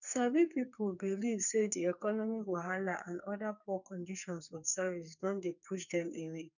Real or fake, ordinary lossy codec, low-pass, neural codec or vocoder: fake; none; 7.2 kHz; codec, 44.1 kHz, 2.6 kbps, SNAC